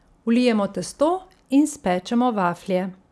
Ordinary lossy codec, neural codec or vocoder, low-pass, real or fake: none; none; none; real